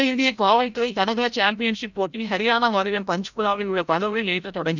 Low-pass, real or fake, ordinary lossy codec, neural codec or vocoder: 7.2 kHz; fake; none; codec, 16 kHz, 0.5 kbps, FreqCodec, larger model